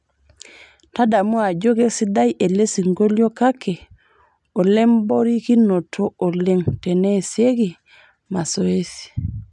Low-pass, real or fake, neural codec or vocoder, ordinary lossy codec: 10.8 kHz; real; none; none